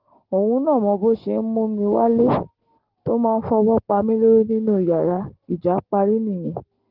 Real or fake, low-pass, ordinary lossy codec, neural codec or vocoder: real; 5.4 kHz; Opus, 16 kbps; none